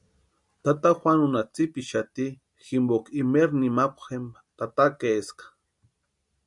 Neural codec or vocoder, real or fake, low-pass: none; real; 10.8 kHz